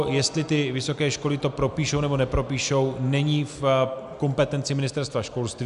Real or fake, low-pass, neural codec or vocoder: real; 10.8 kHz; none